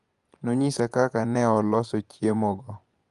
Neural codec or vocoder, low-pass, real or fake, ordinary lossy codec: vocoder, 24 kHz, 100 mel bands, Vocos; 10.8 kHz; fake; Opus, 32 kbps